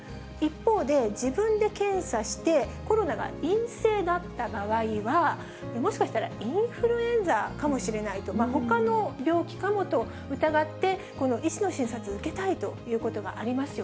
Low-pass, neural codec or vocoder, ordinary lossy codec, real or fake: none; none; none; real